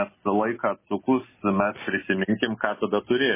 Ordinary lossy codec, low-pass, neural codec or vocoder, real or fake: MP3, 16 kbps; 3.6 kHz; none; real